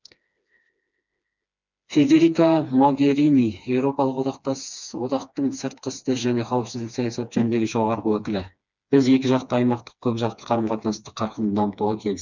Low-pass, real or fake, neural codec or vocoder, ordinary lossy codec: 7.2 kHz; fake; codec, 16 kHz, 2 kbps, FreqCodec, smaller model; none